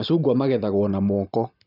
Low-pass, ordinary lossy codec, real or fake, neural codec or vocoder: 5.4 kHz; none; real; none